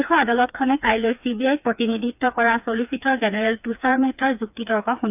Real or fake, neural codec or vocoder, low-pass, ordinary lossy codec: fake; codec, 16 kHz, 4 kbps, FreqCodec, smaller model; 3.6 kHz; none